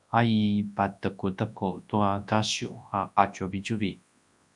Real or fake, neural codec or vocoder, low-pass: fake; codec, 24 kHz, 0.9 kbps, WavTokenizer, large speech release; 10.8 kHz